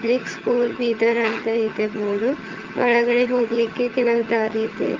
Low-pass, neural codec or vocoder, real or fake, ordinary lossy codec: 7.2 kHz; vocoder, 22.05 kHz, 80 mel bands, HiFi-GAN; fake; Opus, 32 kbps